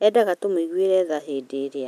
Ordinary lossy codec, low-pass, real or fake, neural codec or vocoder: none; 14.4 kHz; real; none